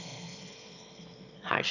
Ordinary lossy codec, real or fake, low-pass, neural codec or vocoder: none; fake; 7.2 kHz; autoencoder, 22.05 kHz, a latent of 192 numbers a frame, VITS, trained on one speaker